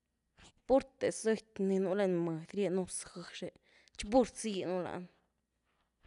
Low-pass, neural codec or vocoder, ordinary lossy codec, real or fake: 10.8 kHz; none; none; real